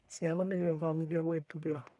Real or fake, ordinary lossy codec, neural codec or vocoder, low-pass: fake; none; codec, 44.1 kHz, 1.7 kbps, Pupu-Codec; 10.8 kHz